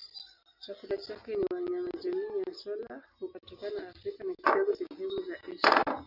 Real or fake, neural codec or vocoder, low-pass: real; none; 5.4 kHz